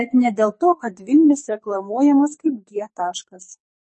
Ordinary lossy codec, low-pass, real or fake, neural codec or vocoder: MP3, 32 kbps; 10.8 kHz; fake; codec, 44.1 kHz, 2.6 kbps, SNAC